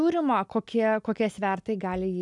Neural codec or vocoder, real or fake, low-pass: none; real; 10.8 kHz